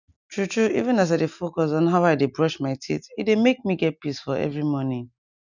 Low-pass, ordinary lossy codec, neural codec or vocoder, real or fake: 7.2 kHz; none; none; real